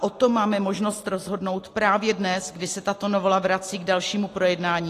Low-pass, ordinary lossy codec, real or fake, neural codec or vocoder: 14.4 kHz; AAC, 48 kbps; real; none